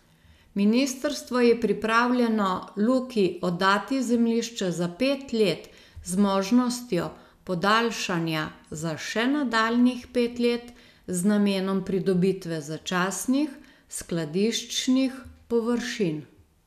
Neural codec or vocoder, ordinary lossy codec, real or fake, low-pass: none; none; real; 14.4 kHz